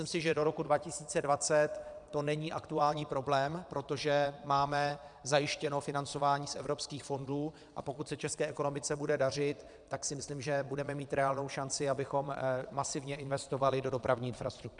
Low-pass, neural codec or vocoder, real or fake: 9.9 kHz; vocoder, 22.05 kHz, 80 mel bands, Vocos; fake